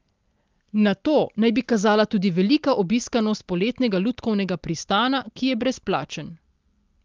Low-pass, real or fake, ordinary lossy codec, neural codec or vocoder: 7.2 kHz; real; Opus, 32 kbps; none